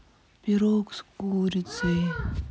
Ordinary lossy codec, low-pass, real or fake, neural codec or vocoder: none; none; real; none